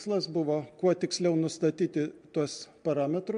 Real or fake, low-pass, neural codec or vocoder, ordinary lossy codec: real; 9.9 kHz; none; MP3, 64 kbps